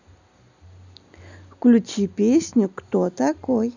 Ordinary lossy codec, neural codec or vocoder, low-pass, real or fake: none; none; 7.2 kHz; real